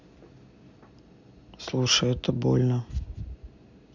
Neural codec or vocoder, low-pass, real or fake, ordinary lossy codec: none; 7.2 kHz; real; none